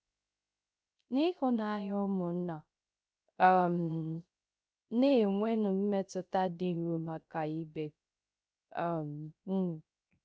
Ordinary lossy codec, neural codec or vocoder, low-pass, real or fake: none; codec, 16 kHz, 0.3 kbps, FocalCodec; none; fake